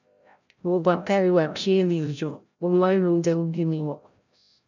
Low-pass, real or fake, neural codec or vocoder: 7.2 kHz; fake; codec, 16 kHz, 0.5 kbps, FreqCodec, larger model